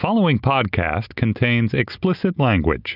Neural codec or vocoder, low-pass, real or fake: none; 5.4 kHz; real